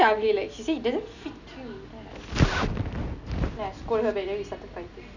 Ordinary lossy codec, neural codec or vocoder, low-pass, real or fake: none; none; 7.2 kHz; real